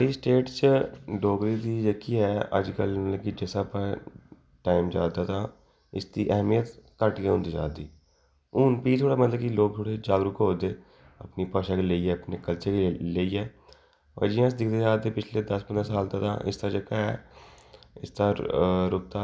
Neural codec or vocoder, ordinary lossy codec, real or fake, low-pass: none; none; real; none